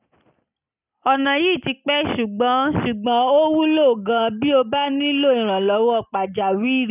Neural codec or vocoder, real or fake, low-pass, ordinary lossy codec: none; real; 3.6 kHz; none